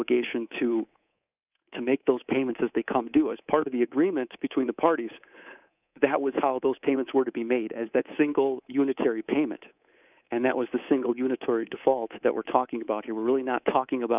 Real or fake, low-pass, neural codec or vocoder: fake; 3.6 kHz; codec, 24 kHz, 3.1 kbps, DualCodec